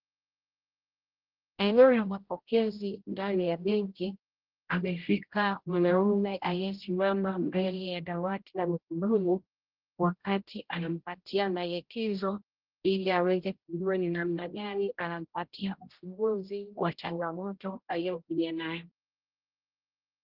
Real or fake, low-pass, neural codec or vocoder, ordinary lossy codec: fake; 5.4 kHz; codec, 16 kHz, 0.5 kbps, X-Codec, HuBERT features, trained on general audio; Opus, 16 kbps